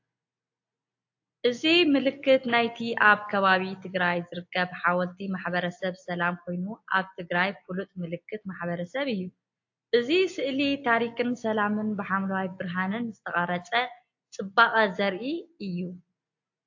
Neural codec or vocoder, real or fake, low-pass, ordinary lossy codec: none; real; 7.2 kHz; AAC, 48 kbps